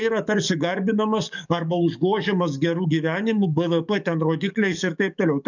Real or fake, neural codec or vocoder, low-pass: fake; codec, 44.1 kHz, 7.8 kbps, DAC; 7.2 kHz